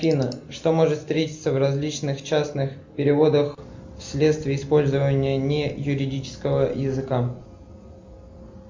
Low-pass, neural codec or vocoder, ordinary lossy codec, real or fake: 7.2 kHz; none; MP3, 64 kbps; real